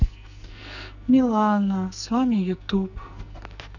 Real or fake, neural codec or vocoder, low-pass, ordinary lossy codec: fake; codec, 44.1 kHz, 2.6 kbps, SNAC; 7.2 kHz; none